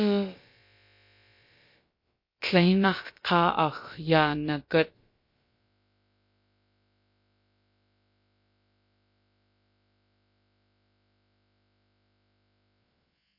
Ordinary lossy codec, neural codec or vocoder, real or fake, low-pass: MP3, 32 kbps; codec, 16 kHz, about 1 kbps, DyCAST, with the encoder's durations; fake; 5.4 kHz